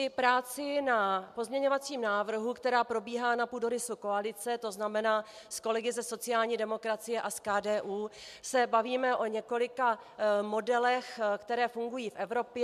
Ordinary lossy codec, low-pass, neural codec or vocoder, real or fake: MP3, 96 kbps; 14.4 kHz; none; real